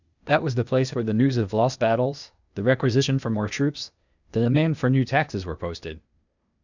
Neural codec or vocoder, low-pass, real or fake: codec, 16 kHz, 0.8 kbps, ZipCodec; 7.2 kHz; fake